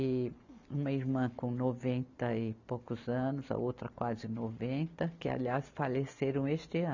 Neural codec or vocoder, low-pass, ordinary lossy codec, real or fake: none; 7.2 kHz; none; real